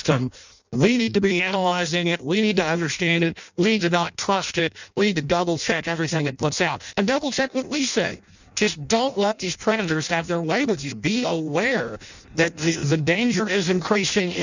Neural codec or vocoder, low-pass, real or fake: codec, 16 kHz in and 24 kHz out, 0.6 kbps, FireRedTTS-2 codec; 7.2 kHz; fake